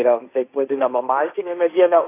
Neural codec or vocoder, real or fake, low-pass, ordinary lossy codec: codec, 16 kHz, 1.1 kbps, Voila-Tokenizer; fake; 3.6 kHz; AAC, 24 kbps